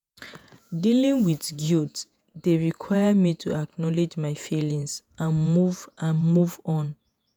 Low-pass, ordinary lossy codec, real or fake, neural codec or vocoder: none; none; fake; vocoder, 48 kHz, 128 mel bands, Vocos